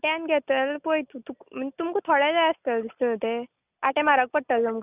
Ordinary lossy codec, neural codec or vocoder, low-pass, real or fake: none; none; 3.6 kHz; real